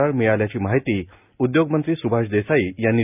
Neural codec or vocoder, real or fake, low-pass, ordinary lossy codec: none; real; 3.6 kHz; none